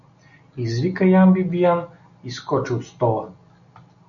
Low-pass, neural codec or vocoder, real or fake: 7.2 kHz; none; real